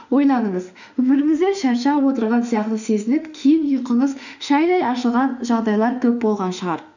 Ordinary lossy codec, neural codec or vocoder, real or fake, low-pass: none; autoencoder, 48 kHz, 32 numbers a frame, DAC-VAE, trained on Japanese speech; fake; 7.2 kHz